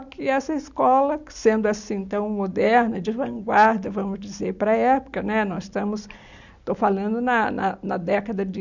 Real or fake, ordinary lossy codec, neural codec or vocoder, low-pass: real; none; none; 7.2 kHz